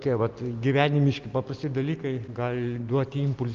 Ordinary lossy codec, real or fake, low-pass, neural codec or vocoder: Opus, 24 kbps; real; 7.2 kHz; none